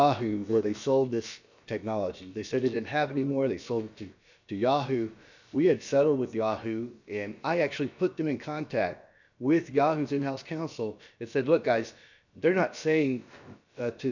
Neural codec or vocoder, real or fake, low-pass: codec, 16 kHz, about 1 kbps, DyCAST, with the encoder's durations; fake; 7.2 kHz